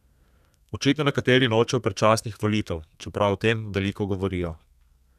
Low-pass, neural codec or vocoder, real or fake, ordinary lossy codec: 14.4 kHz; codec, 32 kHz, 1.9 kbps, SNAC; fake; none